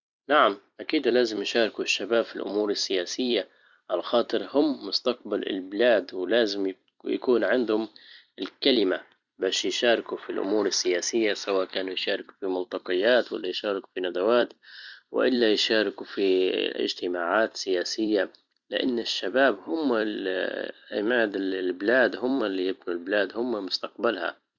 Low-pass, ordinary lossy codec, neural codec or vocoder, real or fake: 7.2 kHz; Opus, 64 kbps; vocoder, 44.1 kHz, 128 mel bands every 256 samples, BigVGAN v2; fake